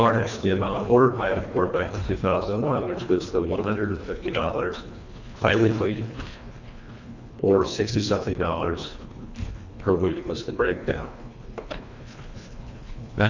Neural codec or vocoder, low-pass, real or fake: codec, 24 kHz, 1.5 kbps, HILCodec; 7.2 kHz; fake